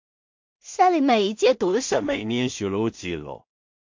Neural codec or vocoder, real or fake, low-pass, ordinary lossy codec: codec, 16 kHz in and 24 kHz out, 0.4 kbps, LongCat-Audio-Codec, two codebook decoder; fake; 7.2 kHz; MP3, 48 kbps